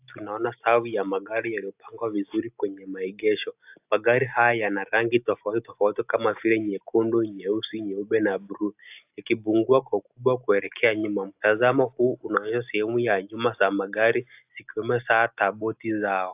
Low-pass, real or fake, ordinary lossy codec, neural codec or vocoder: 3.6 kHz; real; AAC, 32 kbps; none